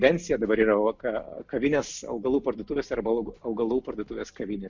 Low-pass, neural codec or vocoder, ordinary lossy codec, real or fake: 7.2 kHz; none; MP3, 64 kbps; real